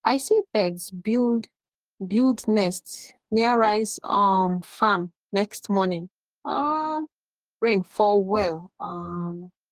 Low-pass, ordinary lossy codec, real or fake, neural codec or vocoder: 14.4 kHz; Opus, 24 kbps; fake; codec, 44.1 kHz, 2.6 kbps, DAC